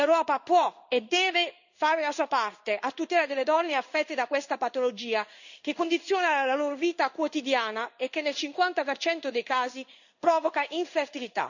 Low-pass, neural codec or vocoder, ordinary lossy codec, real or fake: 7.2 kHz; codec, 16 kHz in and 24 kHz out, 1 kbps, XY-Tokenizer; none; fake